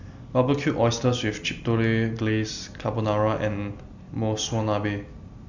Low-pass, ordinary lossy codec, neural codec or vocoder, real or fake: 7.2 kHz; none; none; real